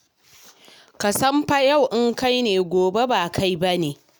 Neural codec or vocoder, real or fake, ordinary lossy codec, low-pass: none; real; none; none